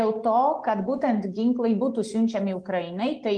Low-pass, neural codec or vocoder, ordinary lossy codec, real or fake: 9.9 kHz; none; Opus, 24 kbps; real